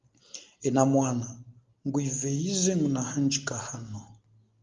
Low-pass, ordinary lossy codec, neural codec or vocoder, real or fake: 7.2 kHz; Opus, 32 kbps; none; real